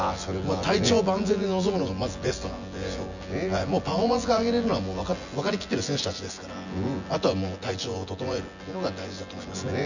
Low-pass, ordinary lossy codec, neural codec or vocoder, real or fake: 7.2 kHz; none; vocoder, 24 kHz, 100 mel bands, Vocos; fake